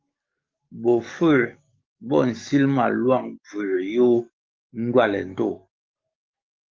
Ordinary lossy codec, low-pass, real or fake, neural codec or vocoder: Opus, 24 kbps; 7.2 kHz; fake; codec, 44.1 kHz, 7.8 kbps, DAC